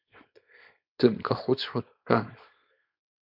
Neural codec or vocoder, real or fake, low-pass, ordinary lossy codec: codec, 24 kHz, 0.9 kbps, WavTokenizer, small release; fake; 5.4 kHz; MP3, 48 kbps